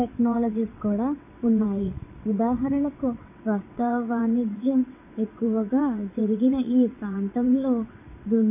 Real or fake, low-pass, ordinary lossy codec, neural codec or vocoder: fake; 3.6 kHz; MP3, 24 kbps; vocoder, 22.05 kHz, 80 mel bands, WaveNeXt